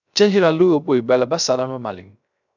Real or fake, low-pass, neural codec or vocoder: fake; 7.2 kHz; codec, 16 kHz, 0.3 kbps, FocalCodec